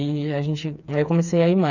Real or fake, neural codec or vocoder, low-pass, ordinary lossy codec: fake; codec, 16 kHz, 4 kbps, FreqCodec, smaller model; 7.2 kHz; none